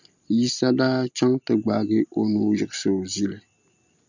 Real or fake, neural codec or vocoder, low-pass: real; none; 7.2 kHz